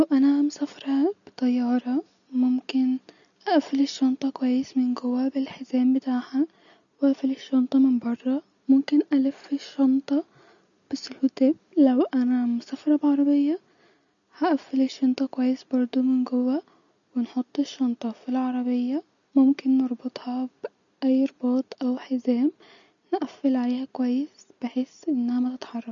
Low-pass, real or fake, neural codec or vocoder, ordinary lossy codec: 7.2 kHz; real; none; none